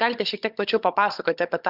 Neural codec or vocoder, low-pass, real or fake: codec, 16 kHz, 16 kbps, FunCodec, trained on Chinese and English, 50 frames a second; 5.4 kHz; fake